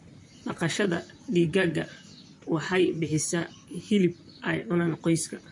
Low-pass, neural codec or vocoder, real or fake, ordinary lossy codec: 10.8 kHz; vocoder, 44.1 kHz, 128 mel bands, Pupu-Vocoder; fake; MP3, 48 kbps